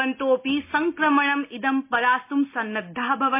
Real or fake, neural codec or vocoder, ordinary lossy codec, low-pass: real; none; MP3, 24 kbps; 3.6 kHz